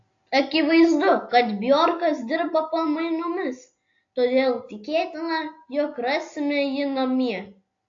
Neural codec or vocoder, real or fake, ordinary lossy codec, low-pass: none; real; AAC, 48 kbps; 7.2 kHz